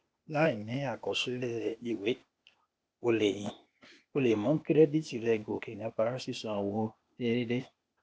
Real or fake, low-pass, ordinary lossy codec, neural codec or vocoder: fake; none; none; codec, 16 kHz, 0.8 kbps, ZipCodec